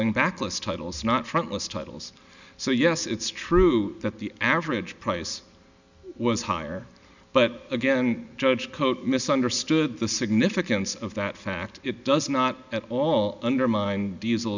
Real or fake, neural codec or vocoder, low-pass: real; none; 7.2 kHz